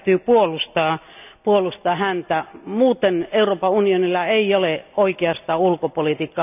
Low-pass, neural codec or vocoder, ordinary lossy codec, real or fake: 3.6 kHz; none; none; real